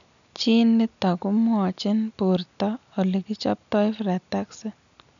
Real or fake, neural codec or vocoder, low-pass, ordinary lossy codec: real; none; 7.2 kHz; none